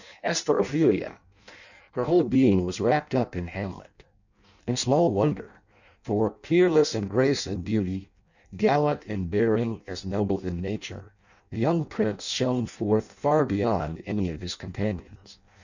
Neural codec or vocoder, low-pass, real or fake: codec, 16 kHz in and 24 kHz out, 0.6 kbps, FireRedTTS-2 codec; 7.2 kHz; fake